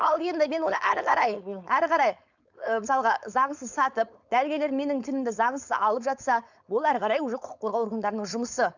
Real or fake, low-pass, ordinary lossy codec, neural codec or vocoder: fake; 7.2 kHz; none; codec, 16 kHz, 4.8 kbps, FACodec